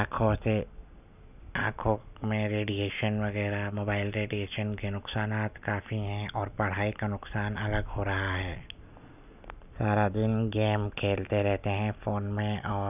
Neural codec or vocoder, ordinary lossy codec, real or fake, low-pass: none; none; real; 3.6 kHz